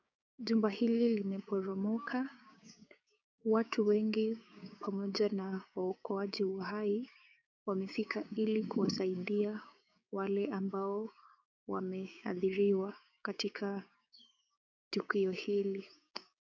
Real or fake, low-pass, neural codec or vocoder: fake; 7.2 kHz; codec, 16 kHz, 6 kbps, DAC